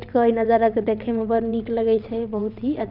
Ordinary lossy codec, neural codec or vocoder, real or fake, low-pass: none; vocoder, 22.05 kHz, 80 mel bands, WaveNeXt; fake; 5.4 kHz